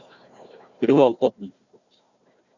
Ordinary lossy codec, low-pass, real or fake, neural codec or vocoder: Opus, 64 kbps; 7.2 kHz; fake; codec, 16 kHz, 1 kbps, FunCodec, trained on Chinese and English, 50 frames a second